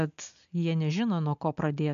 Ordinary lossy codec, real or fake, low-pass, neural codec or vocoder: AAC, 96 kbps; real; 7.2 kHz; none